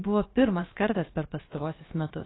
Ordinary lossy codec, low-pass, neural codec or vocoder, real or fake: AAC, 16 kbps; 7.2 kHz; codec, 16 kHz, about 1 kbps, DyCAST, with the encoder's durations; fake